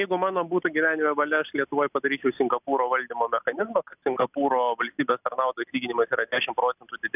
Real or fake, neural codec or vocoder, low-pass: real; none; 3.6 kHz